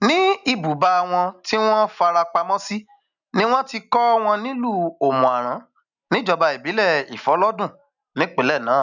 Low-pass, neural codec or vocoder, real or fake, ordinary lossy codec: 7.2 kHz; none; real; none